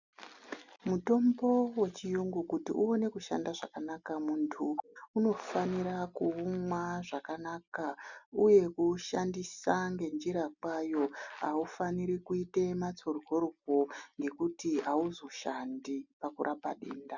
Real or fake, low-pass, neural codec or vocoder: real; 7.2 kHz; none